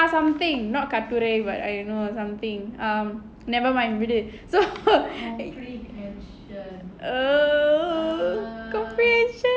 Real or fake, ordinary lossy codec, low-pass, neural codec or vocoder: real; none; none; none